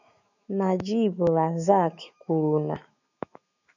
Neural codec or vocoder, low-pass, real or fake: autoencoder, 48 kHz, 128 numbers a frame, DAC-VAE, trained on Japanese speech; 7.2 kHz; fake